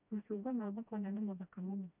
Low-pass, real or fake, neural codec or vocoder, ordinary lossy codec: 3.6 kHz; fake; codec, 16 kHz, 1 kbps, FreqCodec, smaller model; none